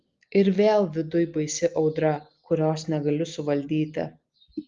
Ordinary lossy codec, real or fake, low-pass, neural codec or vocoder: Opus, 32 kbps; real; 7.2 kHz; none